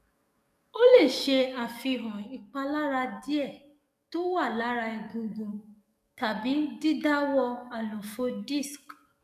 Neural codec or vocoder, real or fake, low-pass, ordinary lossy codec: codec, 44.1 kHz, 7.8 kbps, DAC; fake; 14.4 kHz; none